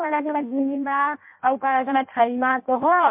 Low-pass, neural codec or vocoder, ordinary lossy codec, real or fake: 3.6 kHz; codec, 16 kHz in and 24 kHz out, 0.6 kbps, FireRedTTS-2 codec; MP3, 32 kbps; fake